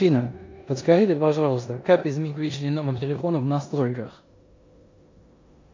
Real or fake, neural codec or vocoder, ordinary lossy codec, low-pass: fake; codec, 16 kHz in and 24 kHz out, 0.9 kbps, LongCat-Audio-Codec, four codebook decoder; MP3, 48 kbps; 7.2 kHz